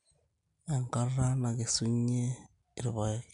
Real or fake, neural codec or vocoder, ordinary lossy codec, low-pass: real; none; none; 10.8 kHz